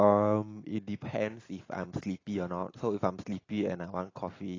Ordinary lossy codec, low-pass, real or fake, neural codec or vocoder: AAC, 32 kbps; 7.2 kHz; real; none